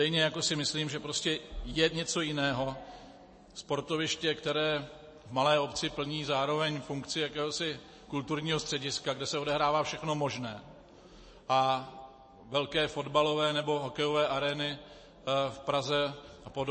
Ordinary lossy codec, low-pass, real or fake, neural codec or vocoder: MP3, 32 kbps; 9.9 kHz; real; none